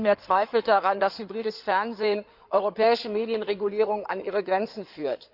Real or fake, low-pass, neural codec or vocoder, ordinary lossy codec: fake; 5.4 kHz; codec, 16 kHz in and 24 kHz out, 2.2 kbps, FireRedTTS-2 codec; none